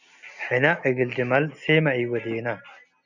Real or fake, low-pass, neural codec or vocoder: real; 7.2 kHz; none